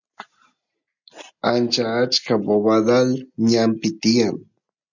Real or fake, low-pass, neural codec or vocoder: real; 7.2 kHz; none